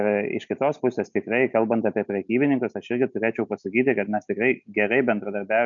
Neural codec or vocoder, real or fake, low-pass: none; real; 7.2 kHz